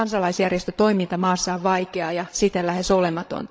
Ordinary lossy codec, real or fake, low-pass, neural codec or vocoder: none; fake; none; codec, 16 kHz, 16 kbps, FreqCodec, larger model